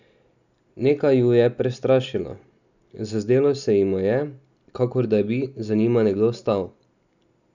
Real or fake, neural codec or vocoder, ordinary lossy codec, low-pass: real; none; none; 7.2 kHz